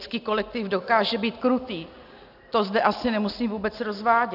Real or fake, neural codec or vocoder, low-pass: real; none; 5.4 kHz